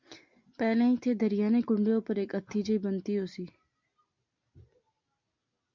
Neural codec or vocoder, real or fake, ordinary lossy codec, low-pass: none; real; MP3, 64 kbps; 7.2 kHz